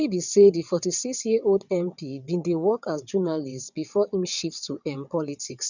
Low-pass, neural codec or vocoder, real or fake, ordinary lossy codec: 7.2 kHz; vocoder, 22.05 kHz, 80 mel bands, WaveNeXt; fake; none